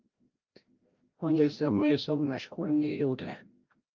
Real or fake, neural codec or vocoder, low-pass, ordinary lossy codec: fake; codec, 16 kHz, 0.5 kbps, FreqCodec, larger model; 7.2 kHz; Opus, 24 kbps